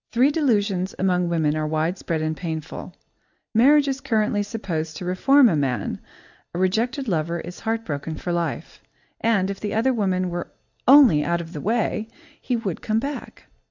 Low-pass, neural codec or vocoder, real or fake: 7.2 kHz; none; real